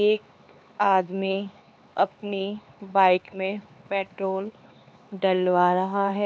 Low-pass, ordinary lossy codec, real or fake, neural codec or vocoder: none; none; fake; codec, 16 kHz, 4 kbps, X-Codec, HuBERT features, trained on LibriSpeech